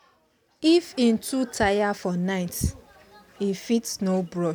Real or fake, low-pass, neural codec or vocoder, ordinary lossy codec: real; none; none; none